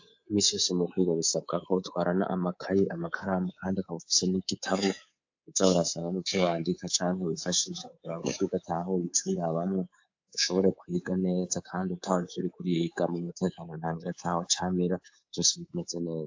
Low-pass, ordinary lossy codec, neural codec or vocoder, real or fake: 7.2 kHz; AAC, 48 kbps; codec, 24 kHz, 3.1 kbps, DualCodec; fake